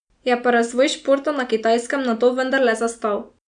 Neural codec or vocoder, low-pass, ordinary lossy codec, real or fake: none; 9.9 kHz; none; real